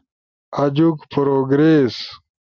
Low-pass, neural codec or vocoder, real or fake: 7.2 kHz; none; real